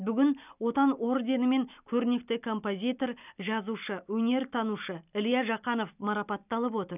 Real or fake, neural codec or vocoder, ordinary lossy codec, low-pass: real; none; none; 3.6 kHz